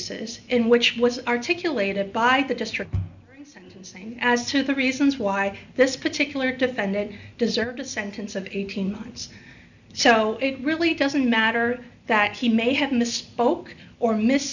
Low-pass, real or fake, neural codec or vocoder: 7.2 kHz; real; none